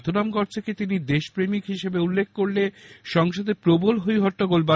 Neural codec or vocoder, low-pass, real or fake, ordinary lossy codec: none; none; real; none